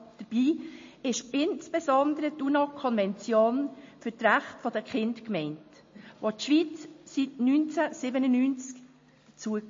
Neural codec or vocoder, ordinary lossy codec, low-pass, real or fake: none; MP3, 32 kbps; 7.2 kHz; real